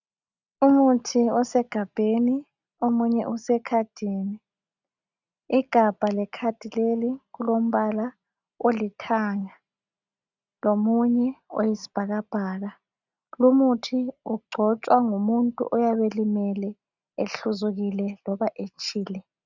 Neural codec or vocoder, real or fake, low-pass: none; real; 7.2 kHz